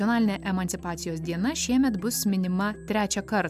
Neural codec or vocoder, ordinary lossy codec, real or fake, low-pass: none; AAC, 96 kbps; real; 14.4 kHz